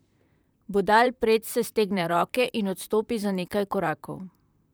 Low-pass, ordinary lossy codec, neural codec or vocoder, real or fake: none; none; vocoder, 44.1 kHz, 128 mel bands, Pupu-Vocoder; fake